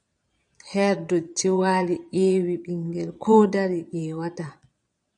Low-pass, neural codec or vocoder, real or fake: 9.9 kHz; vocoder, 22.05 kHz, 80 mel bands, Vocos; fake